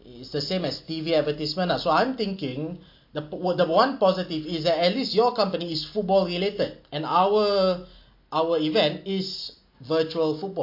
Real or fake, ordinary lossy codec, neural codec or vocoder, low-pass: real; MP3, 32 kbps; none; 5.4 kHz